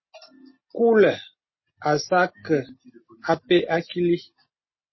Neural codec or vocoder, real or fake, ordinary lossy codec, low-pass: none; real; MP3, 24 kbps; 7.2 kHz